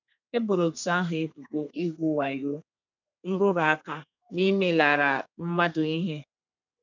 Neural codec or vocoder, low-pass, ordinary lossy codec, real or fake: codec, 32 kHz, 1.9 kbps, SNAC; 7.2 kHz; none; fake